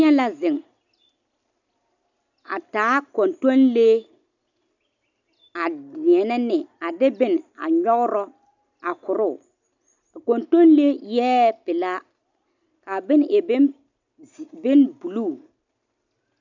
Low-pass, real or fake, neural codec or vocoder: 7.2 kHz; real; none